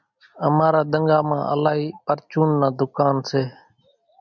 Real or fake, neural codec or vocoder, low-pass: real; none; 7.2 kHz